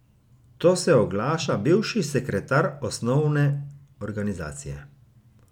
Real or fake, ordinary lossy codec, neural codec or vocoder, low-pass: real; none; none; 19.8 kHz